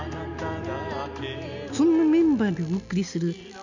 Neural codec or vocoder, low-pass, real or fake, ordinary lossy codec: none; 7.2 kHz; real; none